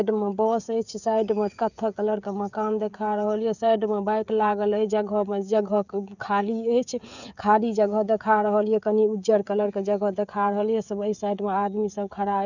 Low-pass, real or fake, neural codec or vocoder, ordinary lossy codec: 7.2 kHz; fake; codec, 16 kHz, 16 kbps, FreqCodec, smaller model; none